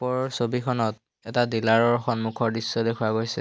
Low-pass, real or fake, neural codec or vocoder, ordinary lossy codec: none; real; none; none